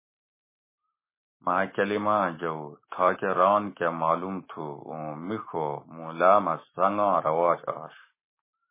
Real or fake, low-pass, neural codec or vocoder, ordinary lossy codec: fake; 3.6 kHz; autoencoder, 48 kHz, 128 numbers a frame, DAC-VAE, trained on Japanese speech; MP3, 16 kbps